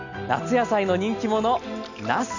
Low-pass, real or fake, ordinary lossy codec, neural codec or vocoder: 7.2 kHz; real; none; none